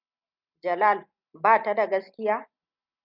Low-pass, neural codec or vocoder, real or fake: 5.4 kHz; none; real